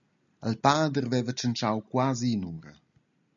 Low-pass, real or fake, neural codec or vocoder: 7.2 kHz; real; none